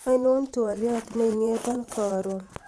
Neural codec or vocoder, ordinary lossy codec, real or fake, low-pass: vocoder, 22.05 kHz, 80 mel bands, Vocos; none; fake; none